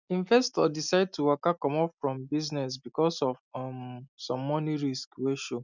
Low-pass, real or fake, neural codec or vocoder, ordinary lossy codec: 7.2 kHz; real; none; none